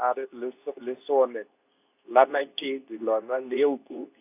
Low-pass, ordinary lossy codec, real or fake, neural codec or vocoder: 3.6 kHz; none; fake; codec, 24 kHz, 0.9 kbps, WavTokenizer, medium speech release version 2